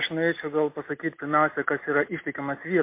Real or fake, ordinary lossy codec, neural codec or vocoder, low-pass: real; MP3, 24 kbps; none; 3.6 kHz